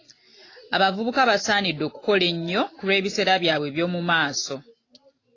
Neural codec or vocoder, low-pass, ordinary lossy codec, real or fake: none; 7.2 kHz; AAC, 32 kbps; real